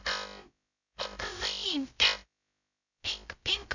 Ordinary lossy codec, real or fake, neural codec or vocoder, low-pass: none; fake; codec, 16 kHz, 0.2 kbps, FocalCodec; 7.2 kHz